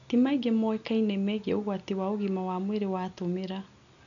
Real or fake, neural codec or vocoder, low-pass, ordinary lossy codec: real; none; 7.2 kHz; none